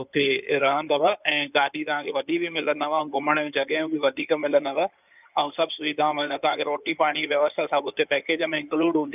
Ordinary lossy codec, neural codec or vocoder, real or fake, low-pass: none; codec, 16 kHz in and 24 kHz out, 2.2 kbps, FireRedTTS-2 codec; fake; 3.6 kHz